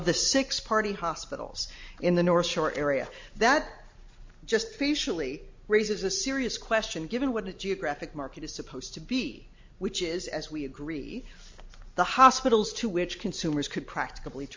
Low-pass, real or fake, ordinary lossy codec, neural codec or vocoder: 7.2 kHz; real; MP3, 48 kbps; none